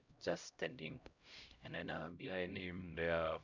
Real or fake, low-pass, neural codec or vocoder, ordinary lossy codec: fake; 7.2 kHz; codec, 16 kHz, 0.5 kbps, X-Codec, HuBERT features, trained on LibriSpeech; Opus, 64 kbps